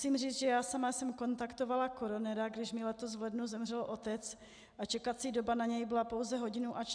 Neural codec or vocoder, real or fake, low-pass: none; real; 9.9 kHz